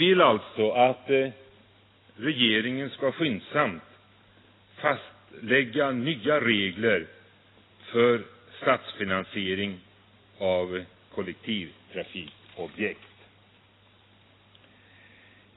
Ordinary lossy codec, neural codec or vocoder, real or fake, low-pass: AAC, 16 kbps; none; real; 7.2 kHz